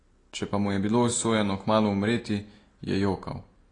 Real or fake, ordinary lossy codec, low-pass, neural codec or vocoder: real; AAC, 32 kbps; 9.9 kHz; none